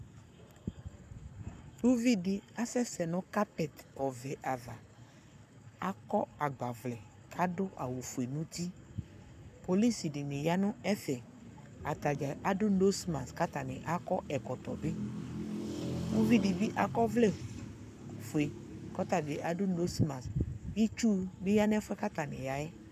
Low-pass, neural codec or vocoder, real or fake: 14.4 kHz; codec, 44.1 kHz, 7.8 kbps, Pupu-Codec; fake